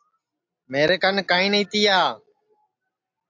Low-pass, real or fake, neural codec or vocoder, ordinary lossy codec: 7.2 kHz; real; none; AAC, 48 kbps